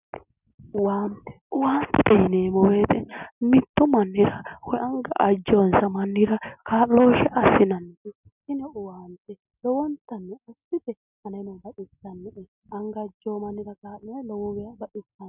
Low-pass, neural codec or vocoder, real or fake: 3.6 kHz; none; real